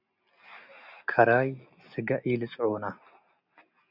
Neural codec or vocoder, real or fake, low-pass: none; real; 5.4 kHz